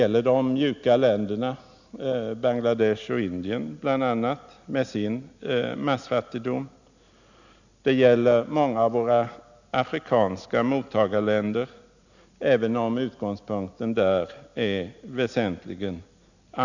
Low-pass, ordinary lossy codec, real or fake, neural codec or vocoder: 7.2 kHz; none; real; none